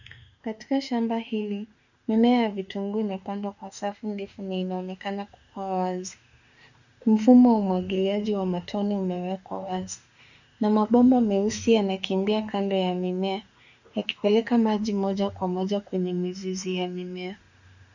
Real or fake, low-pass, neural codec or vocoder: fake; 7.2 kHz; autoencoder, 48 kHz, 32 numbers a frame, DAC-VAE, trained on Japanese speech